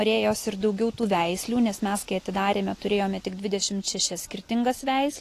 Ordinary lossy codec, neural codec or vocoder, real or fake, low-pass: AAC, 48 kbps; none; real; 14.4 kHz